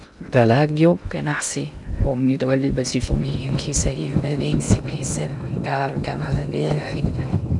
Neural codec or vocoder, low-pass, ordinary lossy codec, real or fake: codec, 16 kHz in and 24 kHz out, 0.6 kbps, FocalCodec, streaming, 2048 codes; 10.8 kHz; none; fake